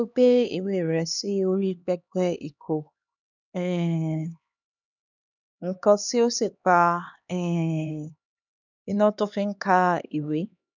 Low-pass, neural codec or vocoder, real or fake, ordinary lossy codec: 7.2 kHz; codec, 16 kHz, 2 kbps, X-Codec, HuBERT features, trained on LibriSpeech; fake; none